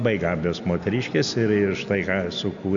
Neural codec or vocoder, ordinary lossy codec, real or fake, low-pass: none; AAC, 64 kbps; real; 7.2 kHz